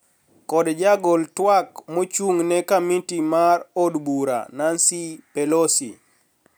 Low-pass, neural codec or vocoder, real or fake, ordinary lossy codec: none; none; real; none